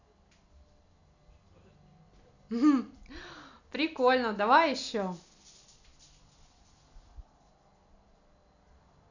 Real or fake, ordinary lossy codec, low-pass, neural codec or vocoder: real; none; 7.2 kHz; none